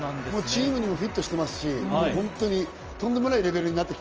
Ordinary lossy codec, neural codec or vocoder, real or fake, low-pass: Opus, 24 kbps; none; real; 7.2 kHz